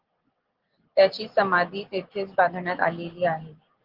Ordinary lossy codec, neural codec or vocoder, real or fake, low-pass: Opus, 16 kbps; none; real; 5.4 kHz